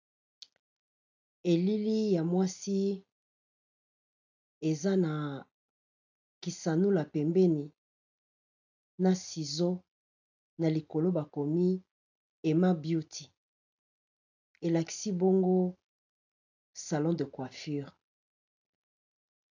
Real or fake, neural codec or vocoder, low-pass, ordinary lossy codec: real; none; 7.2 kHz; AAC, 48 kbps